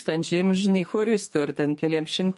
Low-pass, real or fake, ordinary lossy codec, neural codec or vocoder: 14.4 kHz; fake; MP3, 48 kbps; codec, 44.1 kHz, 2.6 kbps, SNAC